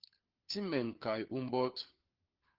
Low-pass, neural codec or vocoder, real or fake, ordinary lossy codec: 5.4 kHz; codec, 16 kHz, 4 kbps, FunCodec, trained on LibriTTS, 50 frames a second; fake; Opus, 16 kbps